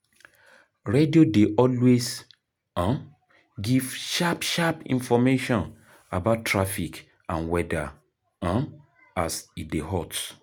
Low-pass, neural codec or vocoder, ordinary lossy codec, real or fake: none; none; none; real